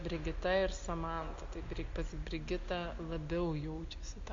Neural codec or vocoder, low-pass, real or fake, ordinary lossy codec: none; 7.2 kHz; real; MP3, 48 kbps